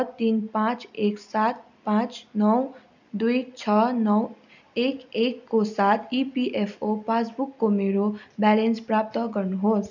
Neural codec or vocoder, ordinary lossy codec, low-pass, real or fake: none; none; 7.2 kHz; real